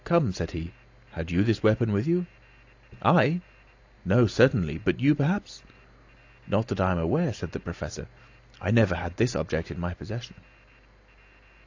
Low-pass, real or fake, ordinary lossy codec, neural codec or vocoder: 7.2 kHz; real; AAC, 48 kbps; none